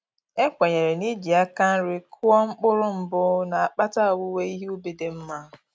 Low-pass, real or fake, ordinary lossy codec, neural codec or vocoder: none; real; none; none